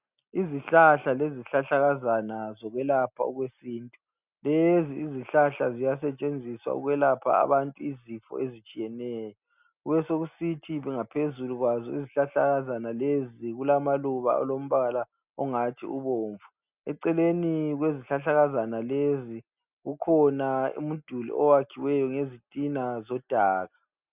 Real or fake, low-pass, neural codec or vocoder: real; 3.6 kHz; none